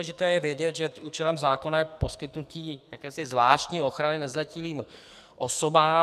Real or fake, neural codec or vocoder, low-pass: fake; codec, 44.1 kHz, 2.6 kbps, SNAC; 14.4 kHz